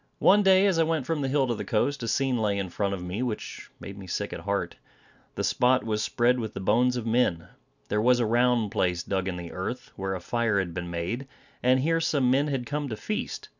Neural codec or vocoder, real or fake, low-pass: none; real; 7.2 kHz